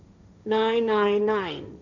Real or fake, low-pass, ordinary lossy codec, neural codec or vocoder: fake; 7.2 kHz; none; codec, 16 kHz, 1.1 kbps, Voila-Tokenizer